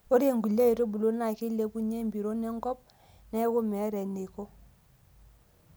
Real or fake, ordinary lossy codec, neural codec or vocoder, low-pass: real; none; none; none